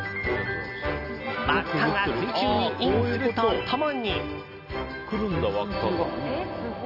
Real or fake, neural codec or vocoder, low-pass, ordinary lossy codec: real; none; 5.4 kHz; none